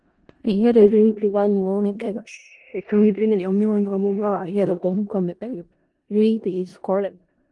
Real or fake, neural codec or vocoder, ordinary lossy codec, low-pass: fake; codec, 16 kHz in and 24 kHz out, 0.4 kbps, LongCat-Audio-Codec, four codebook decoder; Opus, 24 kbps; 10.8 kHz